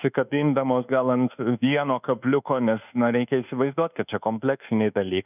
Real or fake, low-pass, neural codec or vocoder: fake; 3.6 kHz; codec, 24 kHz, 1.2 kbps, DualCodec